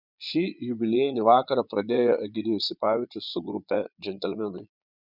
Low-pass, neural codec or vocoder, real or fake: 5.4 kHz; vocoder, 22.05 kHz, 80 mel bands, Vocos; fake